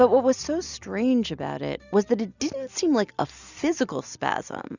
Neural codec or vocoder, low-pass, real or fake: none; 7.2 kHz; real